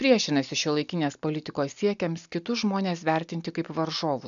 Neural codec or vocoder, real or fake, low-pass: none; real; 7.2 kHz